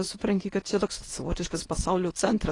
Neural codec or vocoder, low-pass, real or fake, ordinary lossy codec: codec, 24 kHz, 0.9 kbps, WavTokenizer, medium speech release version 1; 10.8 kHz; fake; AAC, 32 kbps